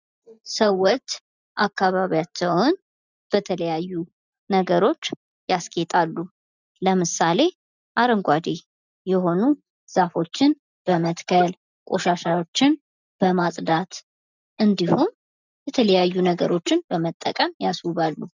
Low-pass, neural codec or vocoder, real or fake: 7.2 kHz; none; real